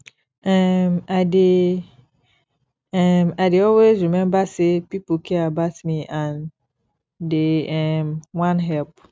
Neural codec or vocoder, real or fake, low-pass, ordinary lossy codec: none; real; none; none